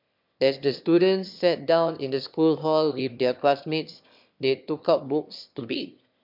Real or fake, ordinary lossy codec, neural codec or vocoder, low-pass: fake; MP3, 48 kbps; autoencoder, 22.05 kHz, a latent of 192 numbers a frame, VITS, trained on one speaker; 5.4 kHz